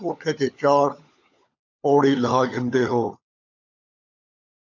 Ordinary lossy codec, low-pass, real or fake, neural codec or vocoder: AAC, 48 kbps; 7.2 kHz; fake; codec, 16 kHz, 16 kbps, FunCodec, trained on LibriTTS, 50 frames a second